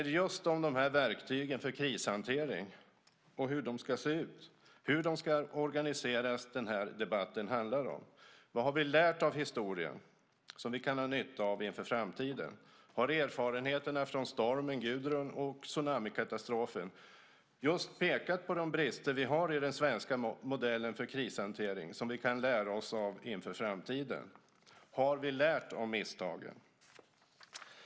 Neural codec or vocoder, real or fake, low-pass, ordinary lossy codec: none; real; none; none